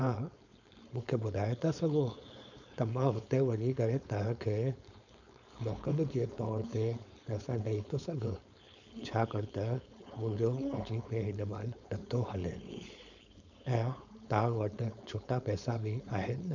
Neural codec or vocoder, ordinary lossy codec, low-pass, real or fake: codec, 16 kHz, 4.8 kbps, FACodec; none; 7.2 kHz; fake